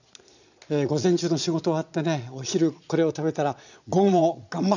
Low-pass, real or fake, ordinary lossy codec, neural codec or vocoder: 7.2 kHz; fake; none; vocoder, 22.05 kHz, 80 mel bands, Vocos